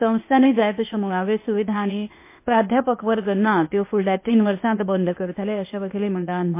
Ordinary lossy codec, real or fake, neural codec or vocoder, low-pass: MP3, 24 kbps; fake; codec, 16 kHz, 0.8 kbps, ZipCodec; 3.6 kHz